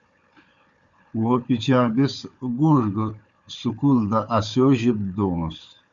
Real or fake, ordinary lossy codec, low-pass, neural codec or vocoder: fake; Opus, 64 kbps; 7.2 kHz; codec, 16 kHz, 4 kbps, FunCodec, trained on Chinese and English, 50 frames a second